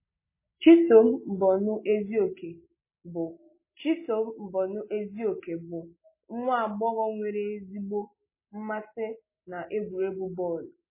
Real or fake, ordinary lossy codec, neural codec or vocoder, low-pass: real; MP3, 16 kbps; none; 3.6 kHz